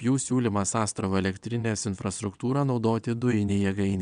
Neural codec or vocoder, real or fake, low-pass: vocoder, 22.05 kHz, 80 mel bands, WaveNeXt; fake; 9.9 kHz